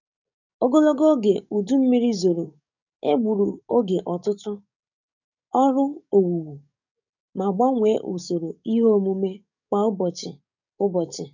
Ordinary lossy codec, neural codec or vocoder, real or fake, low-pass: none; codec, 44.1 kHz, 7.8 kbps, DAC; fake; 7.2 kHz